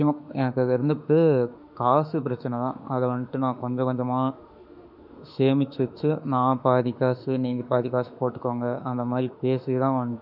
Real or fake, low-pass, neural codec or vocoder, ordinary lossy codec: fake; 5.4 kHz; autoencoder, 48 kHz, 32 numbers a frame, DAC-VAE, trained on Japanese speech; none